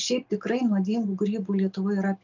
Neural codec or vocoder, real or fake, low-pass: none; real; 7.2 kHz